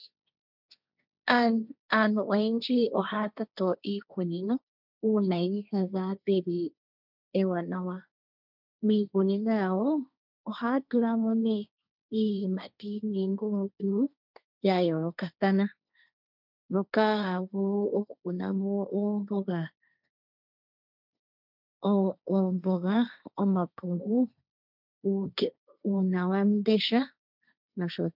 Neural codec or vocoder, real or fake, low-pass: codec, 16 kHz, 1.1 kbps, Voila-Tokenizer; fake; 5.4 kHz